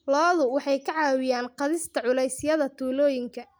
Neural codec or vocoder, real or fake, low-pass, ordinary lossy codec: none; real; none; none